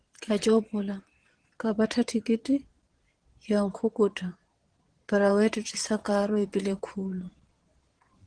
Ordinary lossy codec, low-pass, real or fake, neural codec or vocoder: Opus, 16 kbps; 9.9 kHz; fake; vocoder, 22.05 kHz, 80 mel bands, Vocos